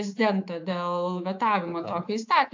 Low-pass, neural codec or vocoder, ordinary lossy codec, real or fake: 7.2 kHz; codec, 24 kHz, 3.1 kbps, DualCodec; AAC, 48 kbps; fake